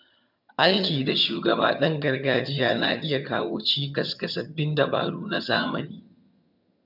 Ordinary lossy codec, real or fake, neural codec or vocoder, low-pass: AAC, 48 kbps; fake; vocoder, 22.05 kHz, 80 mel bands, HiFi-GAN; 5.4 kHz